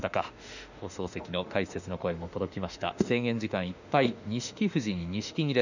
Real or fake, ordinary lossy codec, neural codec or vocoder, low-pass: fake; none; autoencoder, 48 kHz, 32 numbers a frame, DAC-VAE, trained on Japanese speech; 7.2 kHz